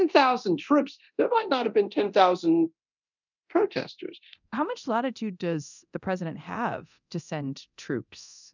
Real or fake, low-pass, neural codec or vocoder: fake; 7.2 kHz; codec, 24 kHz, 0.9 kbps, DualCodec